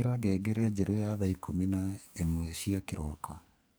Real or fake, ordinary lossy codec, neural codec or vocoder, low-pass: fake; none; codec, 44.1 kHz, 2.6 kbps, SNAC; none